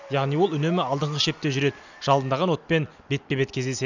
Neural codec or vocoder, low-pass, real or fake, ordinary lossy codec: none; 7.2 kHz; real; none